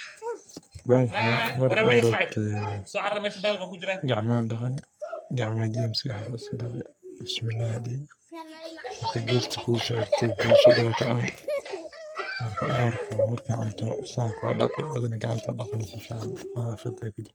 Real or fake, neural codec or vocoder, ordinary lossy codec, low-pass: fake; codec, 44.1 kHz, 3.4 kbps, Pupu-Codec; none; none